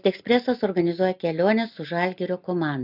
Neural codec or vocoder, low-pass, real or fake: none; 5.4 kHz; real